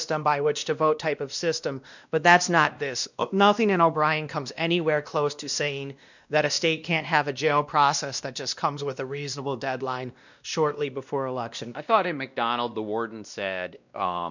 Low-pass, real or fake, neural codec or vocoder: 7.2 kHz; fake; codec, 16 kHz, 1 kbps, X-Codec, WavLM features, trained on Multilingual LibriSpeech